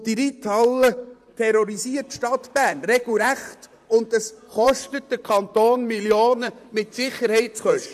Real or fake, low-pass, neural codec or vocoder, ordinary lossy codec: fake; 14.4 kHz; vocoder, 44.1 kHz, 128 mel bands, Pupu-Vocoder; AAC, 96 kbps